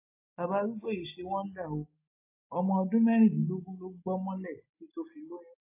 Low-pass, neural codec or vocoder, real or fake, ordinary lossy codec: 3.6 kHz; none; real; AAC, 32 kbps